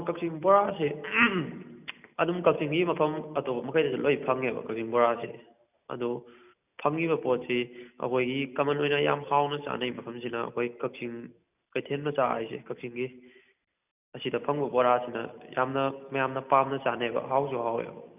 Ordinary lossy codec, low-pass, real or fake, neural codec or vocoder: none; 3.6 kHz; real; none